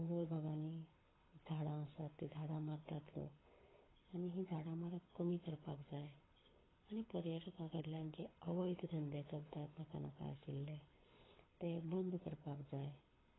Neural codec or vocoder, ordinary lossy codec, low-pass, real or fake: codec, 24 kHz, 6 kbps, HILCodec; AAC, 16 kbps; 7.2 kHz; fake